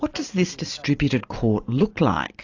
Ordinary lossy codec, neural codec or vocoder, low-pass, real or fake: AAC, 48 kbps; none; 7.2 kHz; real